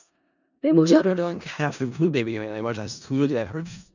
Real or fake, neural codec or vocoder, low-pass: fake; codec, 16 kHz in and 24 kHz out, 0.4 kbps, LongCat-Audio-Codec, four codebook decoder; 7.2 kHz